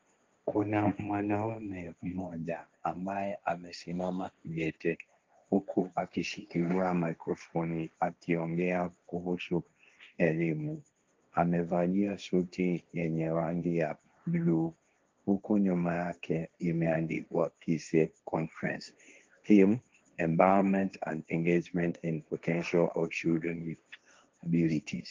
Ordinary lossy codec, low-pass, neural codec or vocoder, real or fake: Opus, 32 kbps; 7.2 kHz; codec, 16 kHz, 1.1 kbps, Voila-Tokenizer; fake